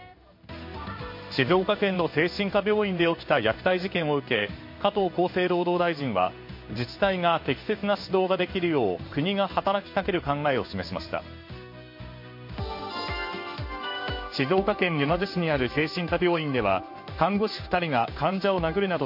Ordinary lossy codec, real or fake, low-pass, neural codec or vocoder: MP3, 32 kbps; fake; 5.4 kHz; codec, 16 kHz in and 24 kHz out, 1 kbps, XY-Tokenizer